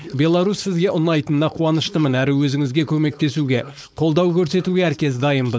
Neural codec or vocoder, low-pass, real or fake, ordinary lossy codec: codec, 16 kHz, 4.8 kbps, FACodec; none; fake; none